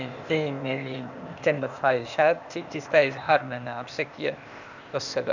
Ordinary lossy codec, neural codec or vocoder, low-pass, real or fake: none; codec, 16 kHz, 0.8 kbps, ZipCodec; 7.2 kHz; fake